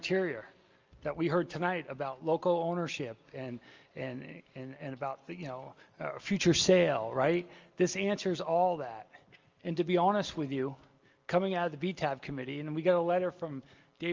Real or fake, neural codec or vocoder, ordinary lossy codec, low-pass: real; none; Opus, 32 kbps; 7.2 kHz